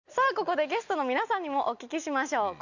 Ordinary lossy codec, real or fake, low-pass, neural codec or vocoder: none; real; 7.2 kHz; none